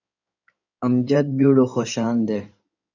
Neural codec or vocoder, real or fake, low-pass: codec, 16 kHz in and 24 kHz out, 2.2 kbps, FireRedTTS-2 codec; fake; 7.2 kHz